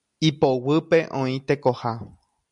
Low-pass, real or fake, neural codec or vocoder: 10.8 kHz; real; none